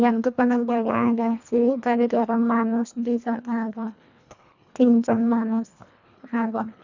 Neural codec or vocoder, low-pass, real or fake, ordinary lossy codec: codec, 24 kHz, 1.5 kbps, HILCodec; 7.2 kHz; fake; none